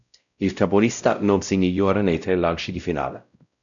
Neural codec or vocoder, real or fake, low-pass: codec, 16 kHz, 0.5 kbps, X-Codec, WavLM features, trained on Multilingual LibriSpeech; fake; 7.2 kHz